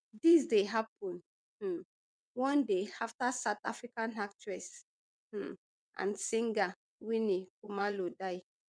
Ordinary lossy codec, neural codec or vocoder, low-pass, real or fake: MP3, 96 kbps; vocoder, 22.05 kHz, 80 mel bands, WaveNeXt; 9.9 kHz; fake